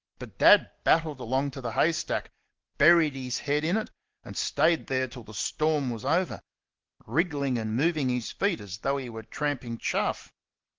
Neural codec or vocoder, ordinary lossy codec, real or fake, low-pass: none; Opus, 16 kbps; real; 7.2 kHz